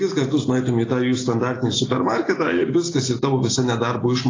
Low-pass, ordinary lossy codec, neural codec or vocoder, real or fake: 7.2 kHz; AAC, 32 kbps; none; real